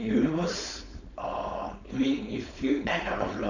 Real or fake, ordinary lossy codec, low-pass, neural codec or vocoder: fake; none; 7.2 kHz; codec, 16 kHz, 4.8 kbps, FACodec